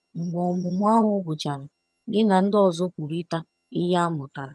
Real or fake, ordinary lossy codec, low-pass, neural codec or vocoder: fake; none; none; vocoder, 22.05 kHz, 80 mel bands, HiFi-GAN